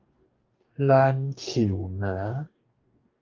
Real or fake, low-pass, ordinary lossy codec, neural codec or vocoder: fake; 7.2 kHz; Opus, 32 kbps; codec, 44.1 kHz, 2.6 kbps, DAC